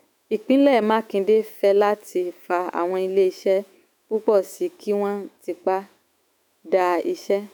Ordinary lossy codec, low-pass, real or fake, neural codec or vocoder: none; none; fake; autoencoder, 48 kHz, 128 numbers a frame, DAC-VAE, trained on Japanese speech